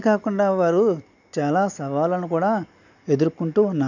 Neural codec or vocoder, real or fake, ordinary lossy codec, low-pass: none; real; none; 7.2 kHz